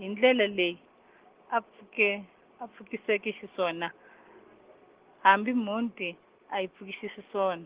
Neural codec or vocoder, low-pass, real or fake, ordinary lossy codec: none; 3.6 kHz; real; Opus, 16 kbps